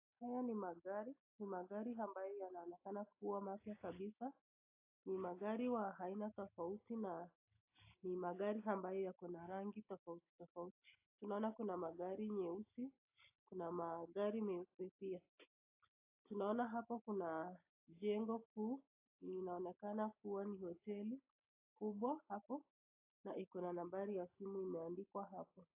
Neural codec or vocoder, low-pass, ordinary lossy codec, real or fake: none; 3.6 kHz; AAC, 32 kbps; real